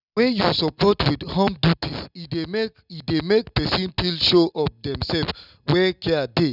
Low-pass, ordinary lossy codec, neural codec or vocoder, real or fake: 5.4 kHz; none; none; real